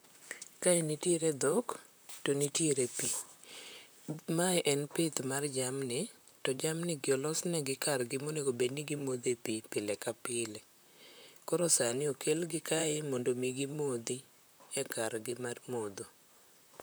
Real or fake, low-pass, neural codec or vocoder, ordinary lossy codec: fake; none; vocoder, 44.1 kHz, 128 mel bands, Pupu-Vocoder; none